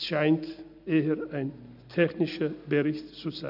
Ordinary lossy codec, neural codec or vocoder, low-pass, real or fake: none; none; 5.4 kHz; real